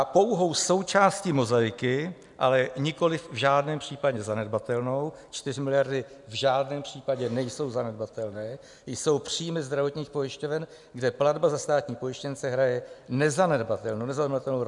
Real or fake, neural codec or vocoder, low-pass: real; none; 10.8 kHz